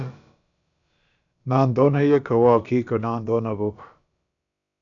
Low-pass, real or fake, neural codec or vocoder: 7.2 kHz; fake; codec, 16 kHz, about 1 kbps, DyCAST, with the encoder's durations